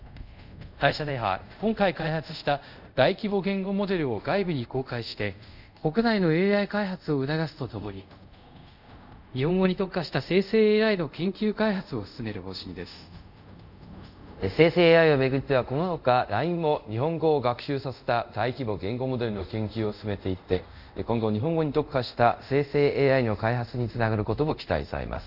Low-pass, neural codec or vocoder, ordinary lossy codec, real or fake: 5.4 kHz; codec, 24 kHz, 0.5 kbps, DualCodec; none; fake